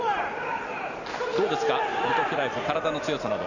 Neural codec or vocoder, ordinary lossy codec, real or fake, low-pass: none; none; real; 7.2 kHz